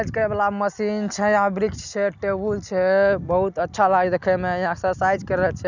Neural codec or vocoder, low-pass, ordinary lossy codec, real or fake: none; 7.2 kHz; none; real